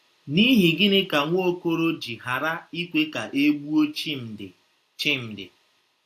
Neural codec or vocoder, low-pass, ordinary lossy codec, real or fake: none; 14.4 kHz; AAC, 64 kbps; real